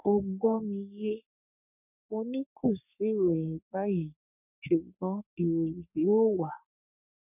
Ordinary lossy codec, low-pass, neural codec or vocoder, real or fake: none; 3.6 kHz; codec, 44.1 kHz, 3.4 kbps, Pupu-Codec; fake